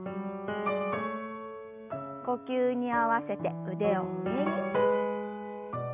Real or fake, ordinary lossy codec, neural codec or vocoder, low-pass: real; none; none; 3.6 kHz